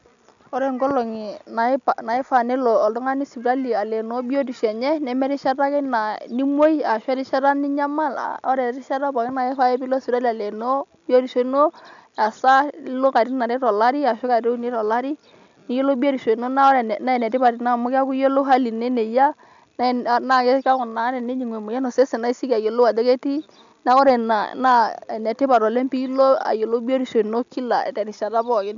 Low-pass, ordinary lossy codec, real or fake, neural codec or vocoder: 7.2 kHz; none; real; none